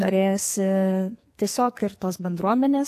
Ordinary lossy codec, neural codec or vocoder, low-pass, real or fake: AAC, 64 kbps; codec, 44.1 kHz, 2.6 kbps, SNAC; 14.4 kHz; fake